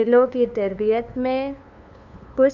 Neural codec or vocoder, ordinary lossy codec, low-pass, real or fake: codec, 16 kHz, 2 kbps, FunCodec, trained on LibriTTS, 25 frames a second; none; 7.2 kHz; fake